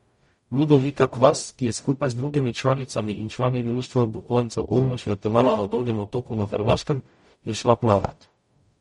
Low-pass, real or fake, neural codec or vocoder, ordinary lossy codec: 19.8 kHz; fake; codec, 44.1 kHz, 0.9 kbps, DAC; MP3, 48 kbps